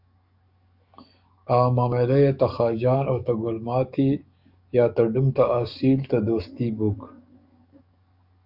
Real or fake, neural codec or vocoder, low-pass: fake; codec, 44.1 kHz, 7.8 kbps, DAC; 5.4 kHz